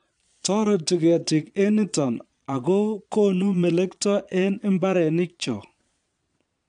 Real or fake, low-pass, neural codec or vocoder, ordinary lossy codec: fake; 9.9 kHz; vocoder, 22.05 kHz, 80 mel bands, Vocos; none